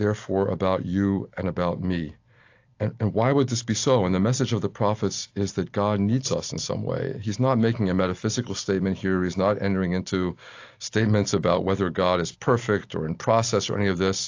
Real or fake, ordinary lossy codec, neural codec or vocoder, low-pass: real; AAC, 48 kbps; none; 7.2 kHz